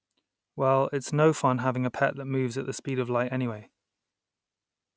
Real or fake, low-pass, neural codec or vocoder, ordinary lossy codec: real; none; none; none